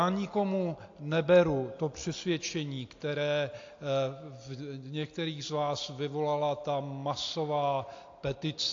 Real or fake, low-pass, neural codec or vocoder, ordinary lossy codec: real; 7.2 kHz; none; AAC, 48 kbps